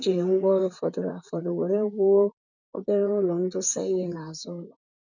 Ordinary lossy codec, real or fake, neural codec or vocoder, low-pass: none; fake; vocoder, 44.1 kHz, 128 mel bands, Pupu-Vocoder; 7.2 kHz